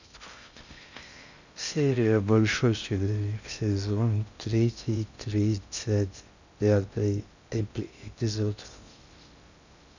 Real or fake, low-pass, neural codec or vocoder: fake; 7.2 kHz; codec, 16 kHz in and 24 kHz out, 0.6 kbps, FocalCodec, streaming, 2048 codes